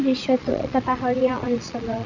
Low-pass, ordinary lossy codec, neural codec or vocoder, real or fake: 7.2 kHz; none; vocoder, 22.05 kHz, 80 mel bands, Vocos; fake